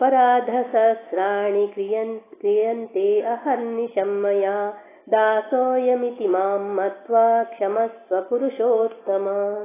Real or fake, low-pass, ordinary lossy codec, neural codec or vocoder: real; 3.6 kHz; AAC, 16 kbps; none